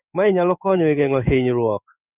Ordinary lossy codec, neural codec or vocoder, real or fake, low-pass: none; codec, 16 kHz in and 24 kHz out, 1 kbps, XY-Tokenizer; fake; 3.6 kHz